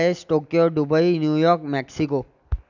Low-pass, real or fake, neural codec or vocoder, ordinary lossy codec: 7.2 kHz; real; none; none